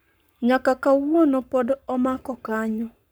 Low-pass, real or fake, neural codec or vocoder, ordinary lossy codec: none; fake; codec, 44.1 kHz, 7.8 kbps, Pupu-Codec; none